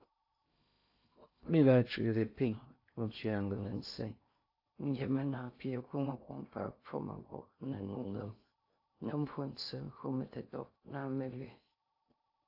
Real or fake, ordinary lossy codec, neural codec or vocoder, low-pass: fake; AAC, 32 kbps; codec, 16 kHz in and 24 kHz out, 0.6 kbps, FocalCodec, streaming, 2048 codes; 5.4 kHz